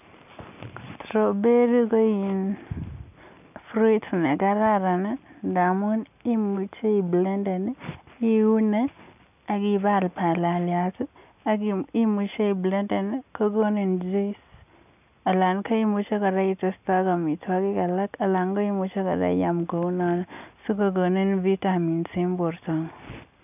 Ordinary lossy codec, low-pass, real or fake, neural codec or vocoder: none; 3.6 kHz; real; none